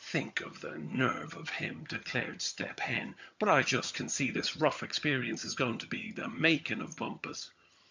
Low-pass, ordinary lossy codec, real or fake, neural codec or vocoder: 7.2 kHz; MP3, 64 kbps; fake; vocoder, 22.05 kHz, 80 mel bands, HiFi-GAN